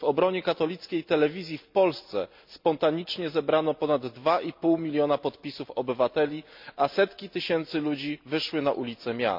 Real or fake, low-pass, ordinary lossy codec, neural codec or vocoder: real; 5.4 kHz; MP3, 48 kbps; none